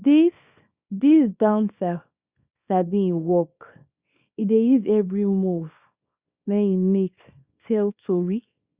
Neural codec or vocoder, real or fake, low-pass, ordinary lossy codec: codec, 24 kHz, 0.9 kbps, WavTokenizer, small release; fake; 3.6 kHz; Opus, 64 kbps